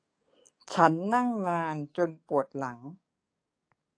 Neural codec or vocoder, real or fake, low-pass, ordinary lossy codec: codec, 44.1 kHz, 7.8 kbps, DAC; fake; 9.9 kHz; AAC, 48 kbps